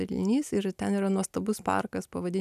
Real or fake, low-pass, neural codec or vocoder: real; 14.4 kHz; none